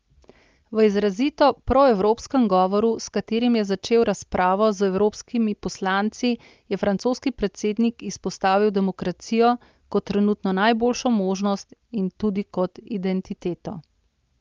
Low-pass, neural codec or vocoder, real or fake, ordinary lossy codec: 7.2 kHz; none; real; Opus, 24 kbps